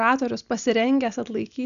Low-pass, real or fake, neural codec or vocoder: 7.2 kHz; real; none